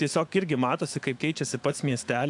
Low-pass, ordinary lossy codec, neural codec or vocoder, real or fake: 10.8 kHz; AAC, 64 kbps; autoencoder, 48 kHz, 128 numbers a frame, DAC-VAE, trained on Japanese speech; fake